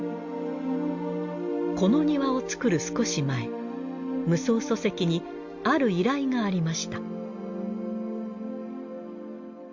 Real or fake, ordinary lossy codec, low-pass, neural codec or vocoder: real; Opus, 64 kbps; 7.2 kHz; none